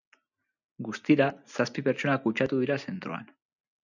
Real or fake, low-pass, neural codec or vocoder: real; 7.2 kHz; none